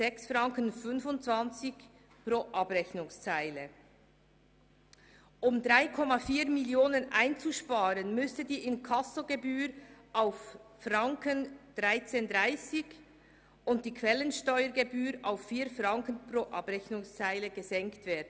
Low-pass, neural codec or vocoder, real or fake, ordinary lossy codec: none; none; real; none